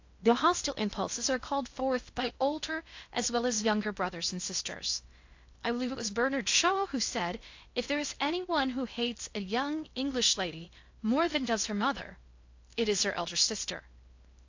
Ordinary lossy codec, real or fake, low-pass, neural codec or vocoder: AAC, 48 kbps; fake; 7.2 kHz; codec, 16 kHz in and 24 kHz out, 0.6 kbps, FocalCodec, streaming, 2048 codes